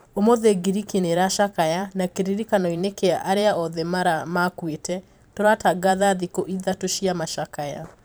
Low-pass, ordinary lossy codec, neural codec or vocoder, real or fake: none; none; vocoder, 44.1 kHz, 128 mel bands every 512 samples, BigVGAN v2; fake